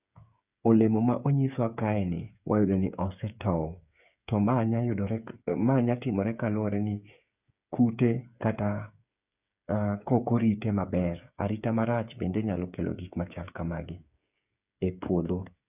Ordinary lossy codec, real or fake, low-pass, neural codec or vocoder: none; fake; 3.6 kHz; codec, 16 kHz, 8 kbps, FreqCodec, smaller model